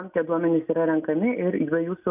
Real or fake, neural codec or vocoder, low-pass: real; none; 3.6 kHz